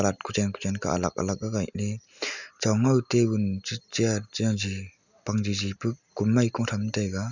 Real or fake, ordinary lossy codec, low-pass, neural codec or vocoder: real; none; 7.2 kHz; none